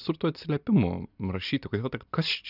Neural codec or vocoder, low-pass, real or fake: none; 5.4 kHz; real